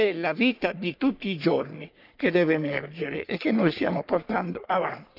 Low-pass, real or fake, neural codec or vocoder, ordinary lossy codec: 5.4 kHz; fake; codec, 44.1 kHz, 3.4 kbps, Pupu-Codec; none